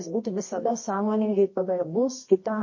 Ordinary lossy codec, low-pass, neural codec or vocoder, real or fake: MP3, 32 kbps; 7.2 kHz; codec, 24 kHz, 0.9 kbps, WavTokenizer, medium music audio release; fake